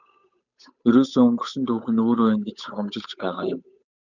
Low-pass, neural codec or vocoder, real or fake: 7.2 kHz; codec, 16 kHz, 8 kbps, FunCodec, trained on Chinese and English, 25 frames a second; fake